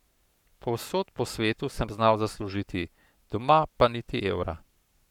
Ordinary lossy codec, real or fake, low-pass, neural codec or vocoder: none; fake; 19.8 kHz; codec, 44.1 kHz, 7.8 kbps, Pupu-Codec